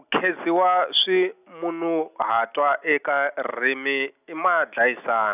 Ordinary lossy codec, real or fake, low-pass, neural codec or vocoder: none; real; 3.6 kHz; none